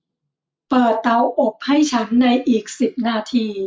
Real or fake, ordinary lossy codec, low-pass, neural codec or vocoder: real; none; none; none